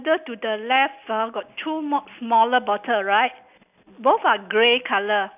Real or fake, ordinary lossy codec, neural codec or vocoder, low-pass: real; none; none; 3.6 kHz